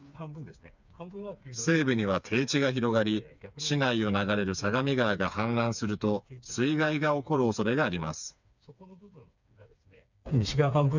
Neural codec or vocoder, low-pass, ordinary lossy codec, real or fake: codec, 16 kHz, 4 kbps, FreqCodec, smaller model; 7.2 kHz; none; fake